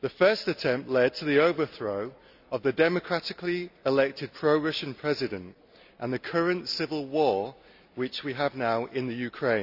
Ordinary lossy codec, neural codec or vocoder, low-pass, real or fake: none; none; 5.4 kHz; real